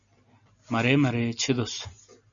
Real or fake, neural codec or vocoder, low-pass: real; none; 7.2 kHz